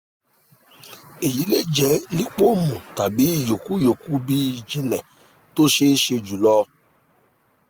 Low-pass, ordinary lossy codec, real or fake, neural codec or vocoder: none; none; real; none